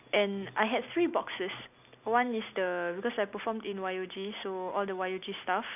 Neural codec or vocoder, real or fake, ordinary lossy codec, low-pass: none; real; none; 3.6 kHz